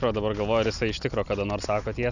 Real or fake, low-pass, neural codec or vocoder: real; 7.2 kHz; none